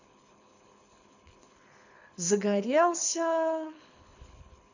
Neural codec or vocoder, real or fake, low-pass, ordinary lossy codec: codec, 24 kHz, 6 kbps, HILCodec; fake; 7.2 kHz; none